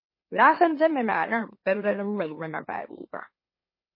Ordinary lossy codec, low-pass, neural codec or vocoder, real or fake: MP3, 24 kbps; 5.4 kHz; autoencoder, 44.1 kHz, a latent of 192 numbers a frame, MeloTTS; fake